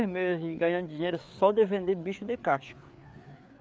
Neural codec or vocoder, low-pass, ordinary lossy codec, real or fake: codec, 16 kHz, 4 kbps, FreqCodec, larger model; none; none; fake